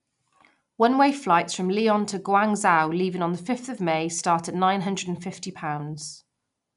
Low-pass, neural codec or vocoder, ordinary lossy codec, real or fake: 10.8 kHz; none; none; real